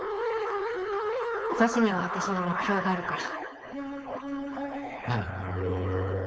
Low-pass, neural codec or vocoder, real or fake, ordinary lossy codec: none; codec, 16 kHz, 4.8 kbps, FACodec; fake; none